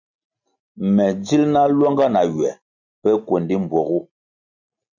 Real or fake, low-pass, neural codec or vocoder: real; 7.2 kHz; none